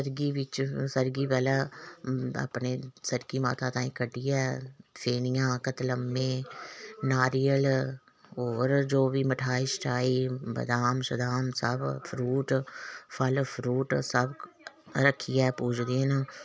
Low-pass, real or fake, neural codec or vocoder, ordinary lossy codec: none; real; none; none